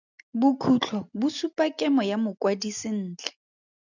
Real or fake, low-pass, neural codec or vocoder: real; 7.2 kHz; none